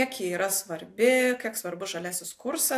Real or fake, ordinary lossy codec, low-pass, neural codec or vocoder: real; AAC, 64 kbps; 14.4 kHz; none